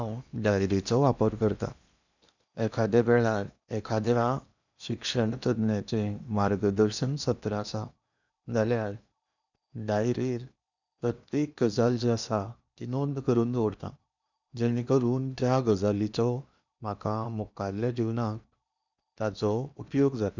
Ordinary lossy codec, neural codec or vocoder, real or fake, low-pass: none; codec, 16 kHz in and 24 kHz out, 0.8 kbps, FocalCodec, streaming, 65536 codes; fake; 7.2 kHz